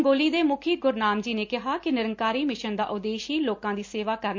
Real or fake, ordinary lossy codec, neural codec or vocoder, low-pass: real; MP3, 48 kbps; none; 7.2 kHz